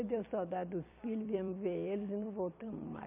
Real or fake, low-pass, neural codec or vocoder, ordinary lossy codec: fake; 3.6 kHz; vocoder, 44.1 kHz, 128 mel bands every 256 samples, BigVGAN v2; MP3, 32 kbps